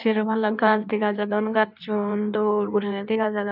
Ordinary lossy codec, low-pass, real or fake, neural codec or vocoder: none; 5.4 kHz; fake; codec, 16 kHz in and 24 kHz out, 1.1 kbps, FireRedTTS-2 codec